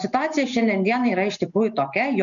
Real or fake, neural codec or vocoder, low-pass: real; none; 7.2 kHz